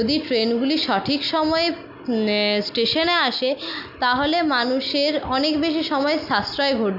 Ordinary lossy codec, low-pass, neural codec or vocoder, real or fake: none; 5.4 kHz; none; real